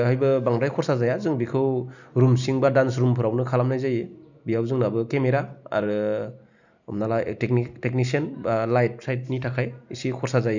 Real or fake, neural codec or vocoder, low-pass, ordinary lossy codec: real; none; 7.2 kHz; none